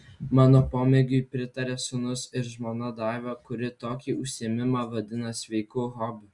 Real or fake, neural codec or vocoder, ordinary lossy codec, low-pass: real; none; Opus, 64 kbps; 10.8 kHz